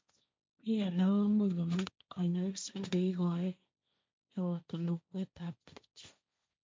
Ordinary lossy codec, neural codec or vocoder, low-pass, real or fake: none; codec, 16 kHz, 1.1 kbps, Voila-Tokenizer; none; fake